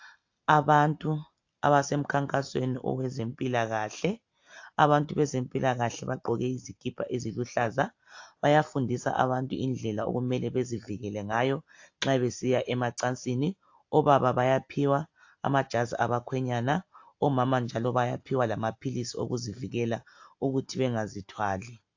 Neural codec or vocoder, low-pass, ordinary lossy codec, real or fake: none; 7.2 kHz; AAC, 48 kbps; real